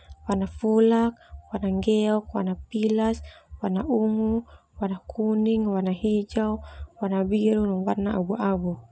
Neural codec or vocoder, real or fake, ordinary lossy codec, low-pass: none; real; none; none